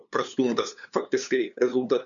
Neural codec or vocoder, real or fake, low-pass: codec, 16 kHz, 2 kbps, FunCodec, trained on LibriTTS, 25 frames a second; fake; 7.2 kHz